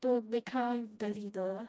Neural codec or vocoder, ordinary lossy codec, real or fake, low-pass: codec, 16 kHz, 1 kbps, FreqCodec, smaller model; none; fake; none